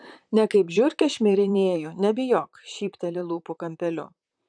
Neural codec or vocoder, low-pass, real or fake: vocoder, 44.1 kHz, 128 mel bands, Pupu-Vocoder; 9.9 kHz; fake